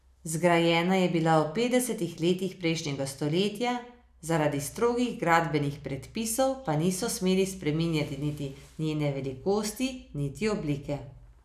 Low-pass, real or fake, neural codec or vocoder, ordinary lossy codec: 14.4 kHz; real; none; none